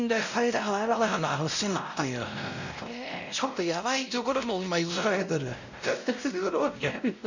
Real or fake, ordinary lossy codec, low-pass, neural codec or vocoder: fake; none; 7.2 kHz; codec, 16 kHz, 0.5 kbps, X-Codec, WavLM features, trained on Multilingual LibriSpeech